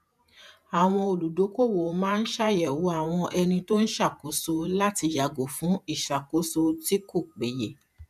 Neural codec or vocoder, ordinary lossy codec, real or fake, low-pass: vocoder, 48 kHz, 128 mel bands, Vocos; none; fake; 14.4 kHz